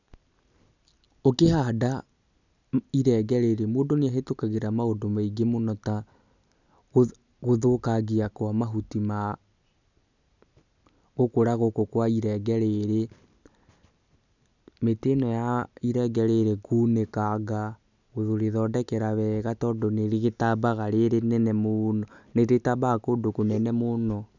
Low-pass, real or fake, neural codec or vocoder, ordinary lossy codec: 7.2 kHz; real; none; none